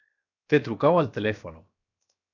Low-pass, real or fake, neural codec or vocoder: 7.2 kHz; fake; codec, 16 kHz, 0.7 kbps, FocalCodec